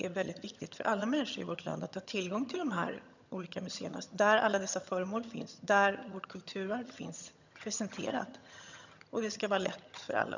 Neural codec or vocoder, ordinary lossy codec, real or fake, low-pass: vocoder, 22.05 kHz, 80 mel bands, HiFi-GAN; none; fake; 7.2 kHz